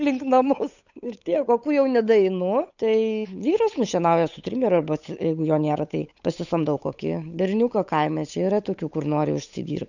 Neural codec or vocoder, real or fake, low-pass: codec, 16 kHz, 8 kbps, FunCodec, trained on Chinese and English, 25 frames a second; fake; 7.2 kHz